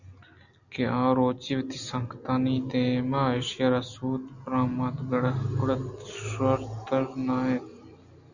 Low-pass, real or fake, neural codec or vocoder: 7.2 kHz; real; none